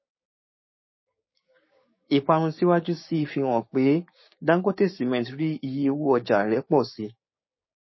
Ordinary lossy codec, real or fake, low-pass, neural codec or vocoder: MP3, 24 kbps; fake; 7.2 kHz; codec, 16 kHz, 6 kbps, DAC